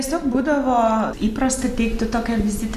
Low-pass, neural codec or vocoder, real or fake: 14.4 kHz; none; real